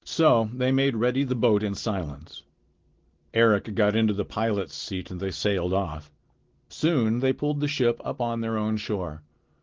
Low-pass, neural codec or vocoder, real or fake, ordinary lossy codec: 7.2 kHz; none; real; Opus, 16 kbps